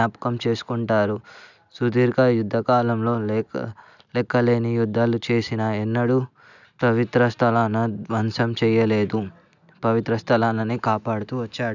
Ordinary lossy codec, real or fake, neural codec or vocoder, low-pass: none; real; none; 7.2 kHz